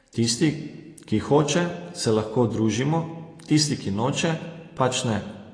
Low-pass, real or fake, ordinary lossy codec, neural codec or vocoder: 9.9 kHz; real; AAC, 32 kbps; none